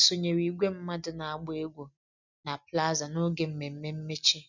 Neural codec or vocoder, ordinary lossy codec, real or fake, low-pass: none; none; real; 7.2 kHz